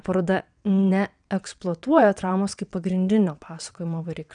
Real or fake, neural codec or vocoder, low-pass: fake; vocoder, 22.05 kHz, 80 mel bands, WaveNeXt; 9.9 kHz